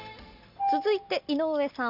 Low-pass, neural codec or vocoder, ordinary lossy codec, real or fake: 5.4 kHz; none; Opus, 64 kbps; real